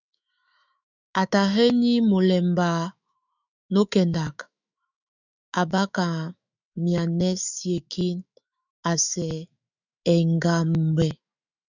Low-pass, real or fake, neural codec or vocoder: 7.2 kHz; fake; autoencoder, 48 kHz, 128 numbers a frame, DAC-VAE, trained on Japanese speech